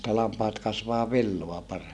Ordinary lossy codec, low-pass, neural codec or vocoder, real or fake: none; none; none; real